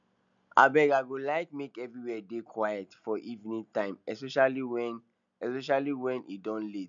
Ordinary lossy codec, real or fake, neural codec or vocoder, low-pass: none; real; none; 7.2 kHz